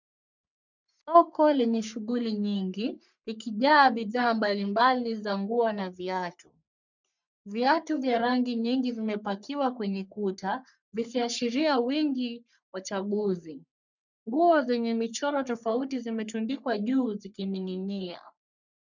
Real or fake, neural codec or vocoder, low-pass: fake; codec, 44.1 kHz, 3.4 kbps, Pupu-Codec; 7.2 kHz